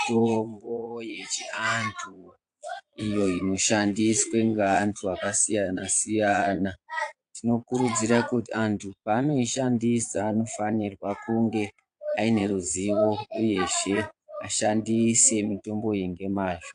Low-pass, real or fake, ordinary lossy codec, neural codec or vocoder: 9.9 kHz; fake; AAC, 64 kbps; vocoder, 22.05 kHz, 80 mel bands, Vocos